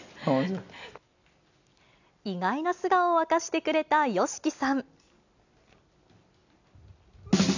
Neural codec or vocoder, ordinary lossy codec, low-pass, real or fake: none; none; 7.2 kHz; real